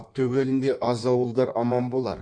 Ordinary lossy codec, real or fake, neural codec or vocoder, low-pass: none; fake; codec, 16 kHz in and 24 kHz out, 1.1 kbps, FireRedTTS-2 codec; 9.9 kHz